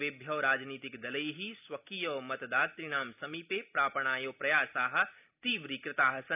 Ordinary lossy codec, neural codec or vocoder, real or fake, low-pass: none; none; real; 3.6 kHz